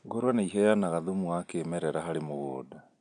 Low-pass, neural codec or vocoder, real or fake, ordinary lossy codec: 9.9 kHz; none; real; none